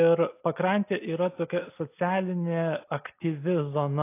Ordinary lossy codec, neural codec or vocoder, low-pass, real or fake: AAC, 24 kbps; none; 3.6 kHz; real